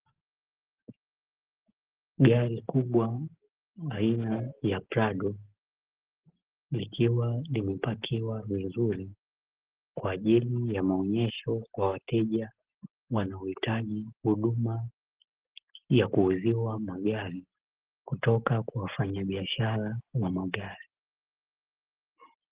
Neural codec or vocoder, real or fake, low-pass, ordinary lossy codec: none; real; 3.6 kHz; Opus, 16 kbps